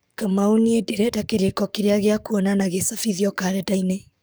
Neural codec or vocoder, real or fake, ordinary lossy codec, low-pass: codec, 44.1 kHz, 7.8 kbps, DAC; fake; none; none